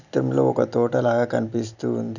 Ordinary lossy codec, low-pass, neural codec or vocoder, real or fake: none; 7.2 kHz; none; real